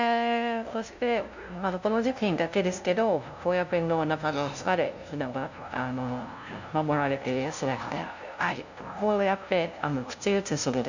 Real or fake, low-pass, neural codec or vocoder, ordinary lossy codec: fake; 7.2 kHz; codec, 16 kHz, 0.5 kbps, FunCodec, trained on LibriTTS, 25 frames a second; none